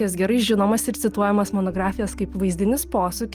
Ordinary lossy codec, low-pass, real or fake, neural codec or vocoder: Opus, 32 kbps; 14.4 kHz; real; none